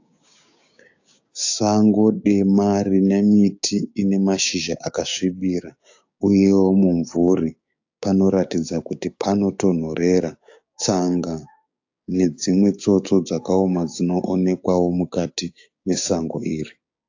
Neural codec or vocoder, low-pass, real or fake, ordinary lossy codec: codec, 16 kHz, 6 kbps, DAC; 7.2 kHz; fake; AAC, 48 kbps